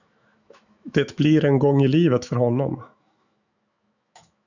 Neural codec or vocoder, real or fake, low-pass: autoencoder, 48 kHz, 128 numbers a frame, DAC-VAE, trained on Japanese speech; fake; 7.2 kHz